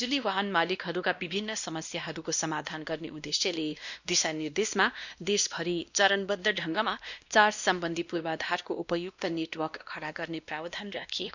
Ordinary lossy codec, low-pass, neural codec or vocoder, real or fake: none; 7.2 kHz; codec, 16 kHz, 1 kbps, X-Codec, WavLM features, trained on Multilingual LibriSpeech; fake